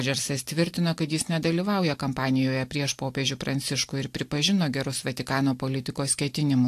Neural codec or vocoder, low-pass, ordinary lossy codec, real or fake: none; 14.4 kHz; AAC, 64 kbps; real